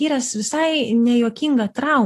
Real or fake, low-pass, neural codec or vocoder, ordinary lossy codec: real; 14.4 kHz; none; AAC, 48 kbps